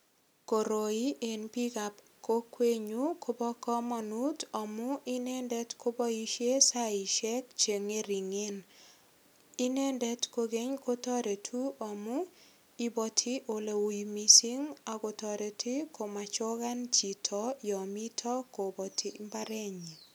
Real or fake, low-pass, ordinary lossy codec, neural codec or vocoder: real; none; none; none